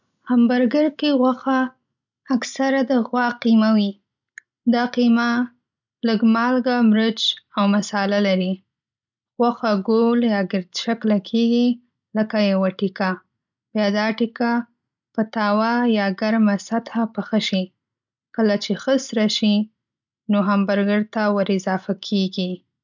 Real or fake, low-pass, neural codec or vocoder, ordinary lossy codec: real; 7.2 kHz; none; none